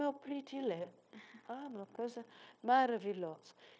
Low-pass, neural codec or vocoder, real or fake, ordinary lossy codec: none; codec, 16 kHz, 0.9 kbps, LongCat-Audio-Codec; fake; none